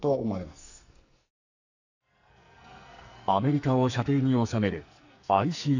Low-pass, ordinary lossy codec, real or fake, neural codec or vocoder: 7.2 kHz; none; fake; codec, 44.1 kHz, 2.6 kbps, SNAC